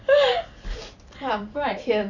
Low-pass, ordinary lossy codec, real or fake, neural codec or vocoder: 7.2 kHz; none; fake; vocoder, 44.1 kHz, 128 mel bands every 256 samples, BigVGAN v2